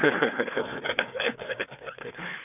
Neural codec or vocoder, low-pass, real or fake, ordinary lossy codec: codec, 16 kHz, 4 kbps, FreqCodec, larger model; 3.6 kHz; fake; none